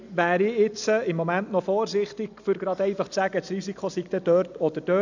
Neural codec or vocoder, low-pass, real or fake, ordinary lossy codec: none; 7.2 kHz; real; none